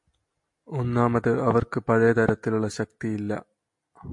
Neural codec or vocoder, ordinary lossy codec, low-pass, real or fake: none; MP3, 48 kbps; 10.8 kHz; real